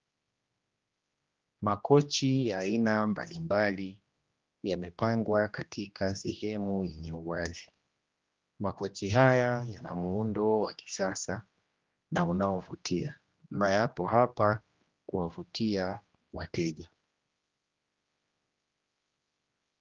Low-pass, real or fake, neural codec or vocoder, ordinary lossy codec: 7.2 kHz; fake; codec, 16 kHz, 1 kbps, X-Codec, HuBERT features, trained on general audio; Opus, 32 kbps